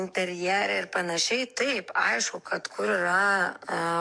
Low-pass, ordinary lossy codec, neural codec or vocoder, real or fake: 9.9 kHz; MP3, 64 kbps; codec, 44.1 kHz, 7.8 kbps, DAC; fake